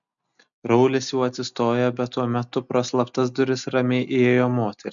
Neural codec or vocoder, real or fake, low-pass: none; real; 7.2 kHz